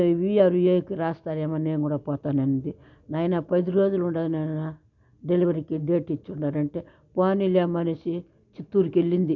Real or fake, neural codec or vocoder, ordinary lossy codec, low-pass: real; none; none; 7.2 kHz